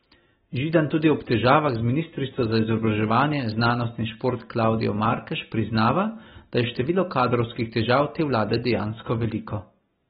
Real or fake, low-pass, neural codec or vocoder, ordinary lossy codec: real; 10.8 kHz; none; AAC, 16 kbps